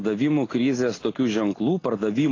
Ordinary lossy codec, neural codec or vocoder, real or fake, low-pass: AAC, 32 kbps; none; real; 7.2 kHz